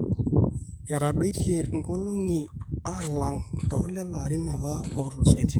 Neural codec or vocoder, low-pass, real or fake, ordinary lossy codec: codec, 44.1 kHz, 2.6 kbps, SNAC; none; fake; none